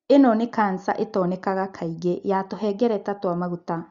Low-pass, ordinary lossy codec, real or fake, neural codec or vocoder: 7.2 kHz; Opus, 64 kbps; real; none